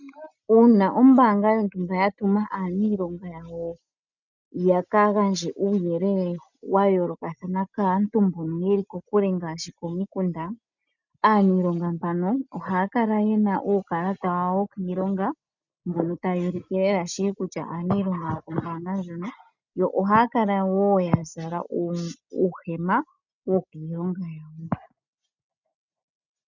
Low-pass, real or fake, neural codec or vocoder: 7.2 kHz; real; none